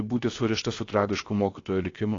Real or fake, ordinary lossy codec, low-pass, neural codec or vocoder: fake; AAC, 32 kbps; 7.2 kHz; codec, 16 kHz, about 1 kbps, DyCAST, with the encoder's durations